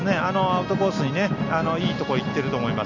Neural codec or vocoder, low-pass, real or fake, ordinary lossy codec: none; 7.2 kHz; real; none